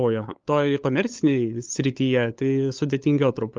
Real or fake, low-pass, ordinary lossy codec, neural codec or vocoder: fake; 7.2 kHz; Opus, 32 kbps; codec, 16 kHz, 8 kbps, FunCodec, trained on LibriTTS, 25 frames a second